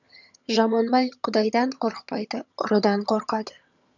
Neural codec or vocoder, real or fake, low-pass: vocoder, 22.05 kHz, 80 mel bands, HiFi-GAN; fake; 7.2 kHz